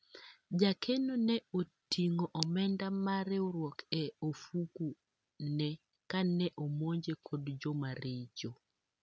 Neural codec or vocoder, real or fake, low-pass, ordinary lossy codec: none; real; none; none